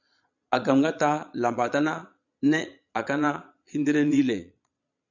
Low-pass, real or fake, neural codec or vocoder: 7.2 kHz; fake; vocoder, 22.05 kHz, 80 mel bands, Vocos